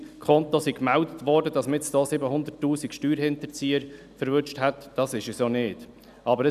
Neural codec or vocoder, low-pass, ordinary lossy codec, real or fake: none; 14.4 kHz; none; real